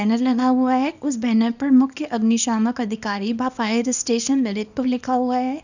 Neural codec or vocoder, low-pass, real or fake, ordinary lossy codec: codec, 24 kHz, 0.9 kbps, WavTokenizer, small release; 7.2 kHz; fake; none